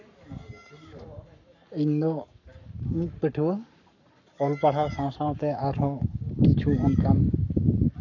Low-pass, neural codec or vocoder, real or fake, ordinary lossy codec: 7.2 kHz; codec, 44.1 kHz, 7.8 kbps, Pupu-Codec; fake; none